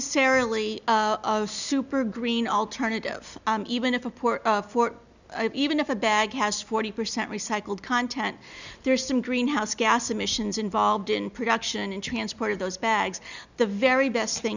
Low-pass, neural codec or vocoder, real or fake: 7.2 kHz; none; real